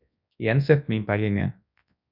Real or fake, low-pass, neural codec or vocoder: fake; 5.4 kHz; codec, 24 kHz, 0.9 kbps, WavTokenizer, large speech release